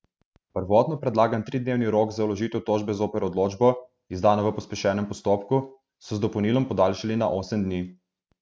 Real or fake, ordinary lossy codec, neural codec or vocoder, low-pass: real; none; none; none